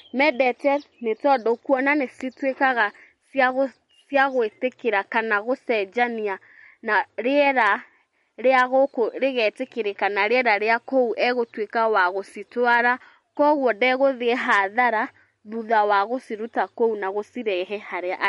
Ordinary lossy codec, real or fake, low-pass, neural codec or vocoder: MP3, 48 kbps; real; 19.8 kHz; none